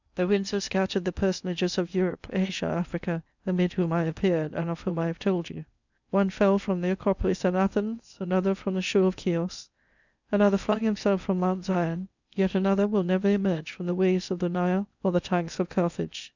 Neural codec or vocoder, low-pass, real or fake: codec, 16 kHz in and 24 kHz out, 0.8 kbps, FocalCodec, streaming, 65536 codes; 7.2 kHz; fake